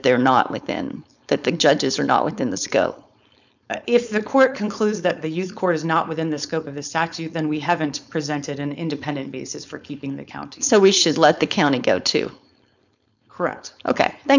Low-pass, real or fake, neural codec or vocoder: 7.2 kHz; fake; codec, 16 kHz, 4.8 kbps, FACodec